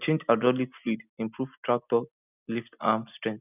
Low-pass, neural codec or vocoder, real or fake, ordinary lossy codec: 3.6 kHz; none; real; none